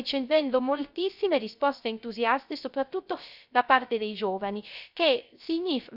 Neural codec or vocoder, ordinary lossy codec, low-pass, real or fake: codec, 16 kHz, 0.3 kbps, FocalCodec; none; 5.4 kHz; fake